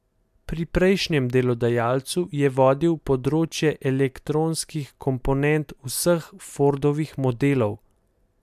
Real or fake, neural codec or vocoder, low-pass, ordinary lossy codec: real; none; 14.4 kHz; MP3, 96 kbps